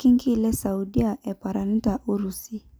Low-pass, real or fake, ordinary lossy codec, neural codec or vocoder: none; real; none; none